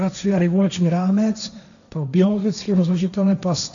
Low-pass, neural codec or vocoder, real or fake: 7.2 kHz; codec, 16 kHz, 1.1 kbps, Voila-Tokenizer; fake